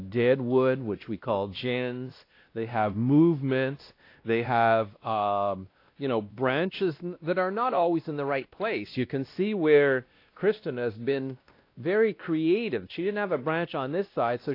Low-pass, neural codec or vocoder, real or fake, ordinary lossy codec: 5.4 kHz; codec, 16 kHz, 1 kbps, X-Codec, WavLM features, trained on Multilingual LibriSpeech; fake; AAC, 32 kbps